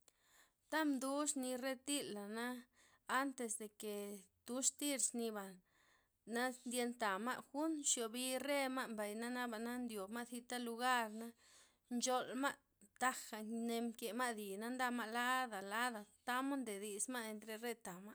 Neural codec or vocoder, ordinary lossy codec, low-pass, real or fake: none; none; none; real